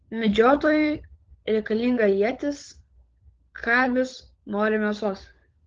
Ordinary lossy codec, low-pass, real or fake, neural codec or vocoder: Opus, 16 kbps; 7.2 kHz; fake; codec, 16 kHz, 16 kbps, FunCodec, trained on LibriTTS, 50 frames a second